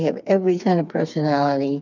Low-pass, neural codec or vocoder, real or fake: 7.2 kHz; codec, 16 kHz, 4 kbps, FreqCodec, smaller model; fake